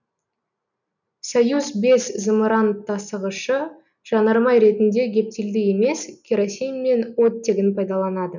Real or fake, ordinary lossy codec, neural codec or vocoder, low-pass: real; none; none; 7.2 kHz